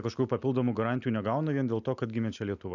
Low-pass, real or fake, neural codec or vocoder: 7.2 kHz; real; none